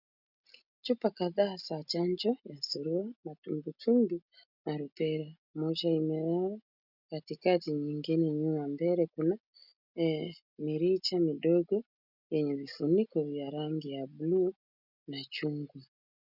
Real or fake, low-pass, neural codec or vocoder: real; 5.4 kHz; none